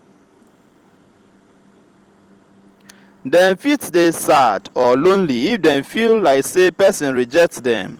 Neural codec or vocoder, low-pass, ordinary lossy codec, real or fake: vocoder, 44.1 kHz, 128 mel bands every 256 samples, BigVGAN v2; 19.8 kHz; Opus, 24 kbps; fake